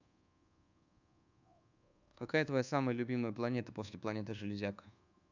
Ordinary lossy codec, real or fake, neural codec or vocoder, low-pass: none; fake; codec, 24 kHz, 1.2 kbps, DualCodec; 7.2 kHz